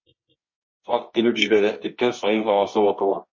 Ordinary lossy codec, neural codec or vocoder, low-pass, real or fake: MP3, 32 kbps; codec, 24 kHz, 0.9 kbps, WavTokenizer, medium music audio release; 7.2 kHz; fake